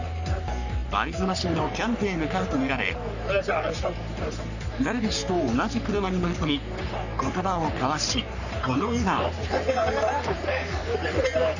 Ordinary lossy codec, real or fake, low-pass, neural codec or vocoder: none; fake; 7.2 kHz; codec, 44.1 kHz, 3.4 kbps, Pupu-Codec